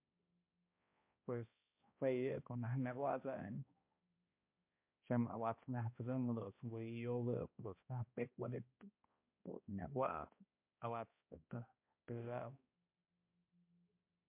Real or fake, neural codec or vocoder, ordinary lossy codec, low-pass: fake; codec, 16 kHz, 1 kbps, X-Codec, HuBERT features, trained on balanced general audio; MP3, 32 kbps; 3.6 kHz